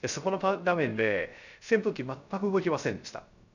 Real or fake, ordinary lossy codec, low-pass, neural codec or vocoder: fake; none; 7.2 kHz; codec, 16 kHz, 0.3 kbps, FocalCodec